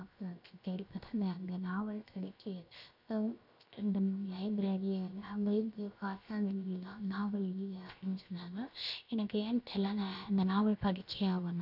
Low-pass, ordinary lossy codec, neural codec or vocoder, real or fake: 5.4 kHz; none; codec, 16 kHz, 0.7 kbps, FocalCodec; fake